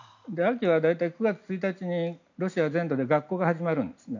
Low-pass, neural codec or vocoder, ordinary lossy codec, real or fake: 7.2 kHz; none; none; real